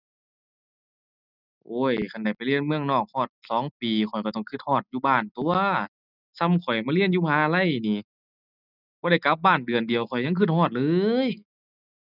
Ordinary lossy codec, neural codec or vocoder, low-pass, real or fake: none; none; 5.4 kHz; real